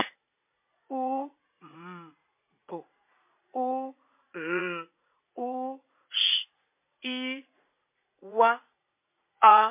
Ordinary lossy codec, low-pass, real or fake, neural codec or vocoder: MP3, 24 kbps; 3.6 kHz; fake; vocoder, 44.1 kHz, 80 mel bands, Vocos